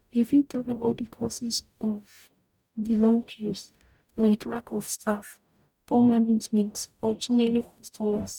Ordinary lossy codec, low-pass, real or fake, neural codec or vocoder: none; 19.8 kHz; fake; codec, 44.1 kHz, 0.9 kbps, DAC